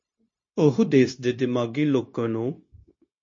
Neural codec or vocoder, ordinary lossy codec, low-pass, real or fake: codec, 16 kHz, 0.9 kbps, LongCat-Audio-Codec; MP3, 32 kbps; 7.2 kHz; fake